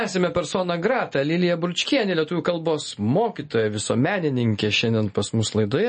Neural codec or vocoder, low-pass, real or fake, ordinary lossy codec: none; 10.8 kHz; real; MP3, 32 kbps